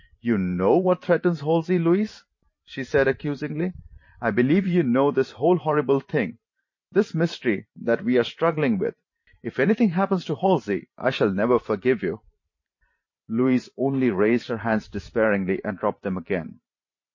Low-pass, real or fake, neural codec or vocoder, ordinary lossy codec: 7.2 kHz; fake; vocoder, 44.1 kHz, 128 mel bands every 512 samples, BigVGAN v2; MP3, 32 kbps